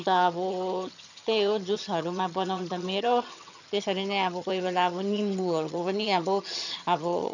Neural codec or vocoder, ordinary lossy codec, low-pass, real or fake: vocoder, 22.05 kHz, 80 mel bands, HiFi-GAN; none; 7.2 kHz; fake